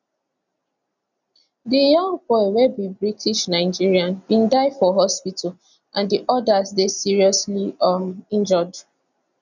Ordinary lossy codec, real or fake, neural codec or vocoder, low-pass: none; real; none; 7.2 kHz